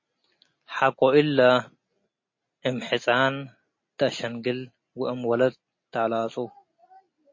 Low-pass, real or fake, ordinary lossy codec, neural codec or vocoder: 7.2 kHz; real; MP3, 32 kbps; none